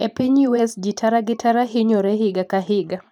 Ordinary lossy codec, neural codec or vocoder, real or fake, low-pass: none; vocoder, 44.1 kHz, 128 mel bands every 512 samples, BigVGAN v2; fake; 19.8 kHz